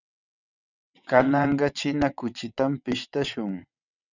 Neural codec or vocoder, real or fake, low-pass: vocoder, 22.05 kHz, 80 mel bands, WaveNeXt; fake; 7.2 kHz